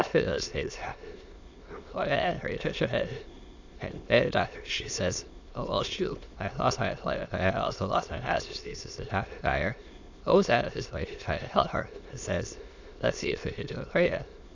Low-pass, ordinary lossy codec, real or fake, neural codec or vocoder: 7.2 kHz; Opus, 64 kbps; fake; autoencoder, 22.05 kHz, a latent of 192 numbers a frame, VITS, trained on many speakers